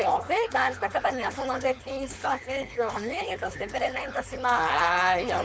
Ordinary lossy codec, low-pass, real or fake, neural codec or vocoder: none; none; fake; codec, 16 kHz, 4.8 kbps, FACodec